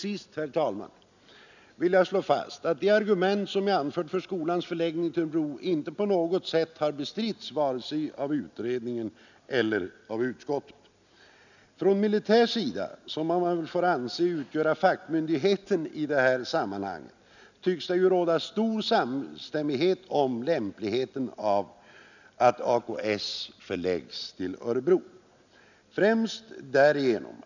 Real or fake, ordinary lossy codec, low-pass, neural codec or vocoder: real; none; 7.2 kHz; none